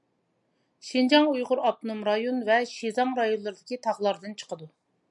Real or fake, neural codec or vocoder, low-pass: real; none; 10.8 kHz